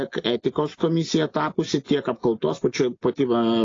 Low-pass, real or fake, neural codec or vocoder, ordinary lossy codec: 7.2 kHz; real; none; AAC, 32 kbps